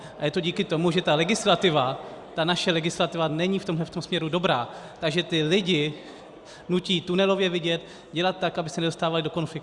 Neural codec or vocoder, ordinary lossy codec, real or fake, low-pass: none; Opus, 64 kbps; real; 10.8 kHz